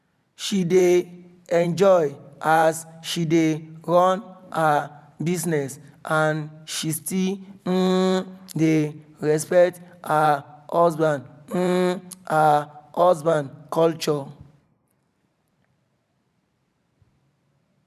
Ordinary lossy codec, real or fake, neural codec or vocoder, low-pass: none; fake; vocoder, 44.1 kHz, 128 mel bands every 512 samples, BigVGAN v2; 14.4 kHz